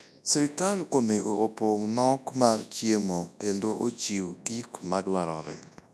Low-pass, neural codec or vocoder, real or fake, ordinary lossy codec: none; codec, 24 kHz, 0.9 kbps, WavTokenizer, large speech release; fake; none